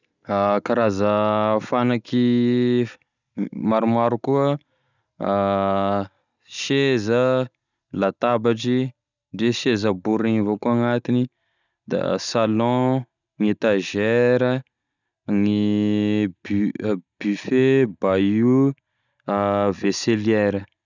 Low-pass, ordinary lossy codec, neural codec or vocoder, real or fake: 7.2 kHz; none; none; real